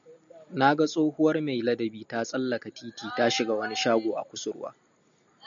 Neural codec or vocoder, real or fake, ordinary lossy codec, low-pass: none; real; MP3, 96 kbps; 7.2 kHz